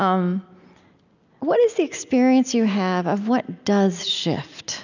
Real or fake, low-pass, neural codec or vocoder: real; 7.2 kHz; none